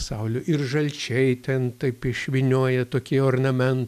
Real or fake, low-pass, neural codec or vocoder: real; 14.4 kHz; none